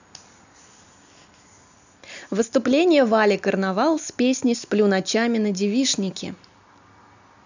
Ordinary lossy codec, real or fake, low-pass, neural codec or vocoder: none; real; 7.2 kHz; none